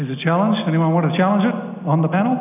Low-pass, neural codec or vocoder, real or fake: 3.6 kHz; none; real